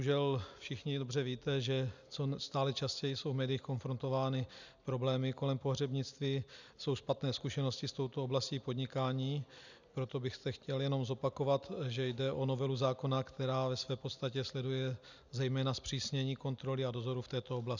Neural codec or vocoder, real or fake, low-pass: none; real; 7.2 kHz